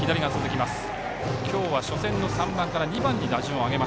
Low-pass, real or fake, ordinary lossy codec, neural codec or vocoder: none; real; none; none